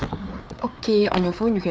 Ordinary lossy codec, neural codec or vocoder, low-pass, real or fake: none; codec, 16 kHz, 4 kbps, FreqCodec, larger model; none; fake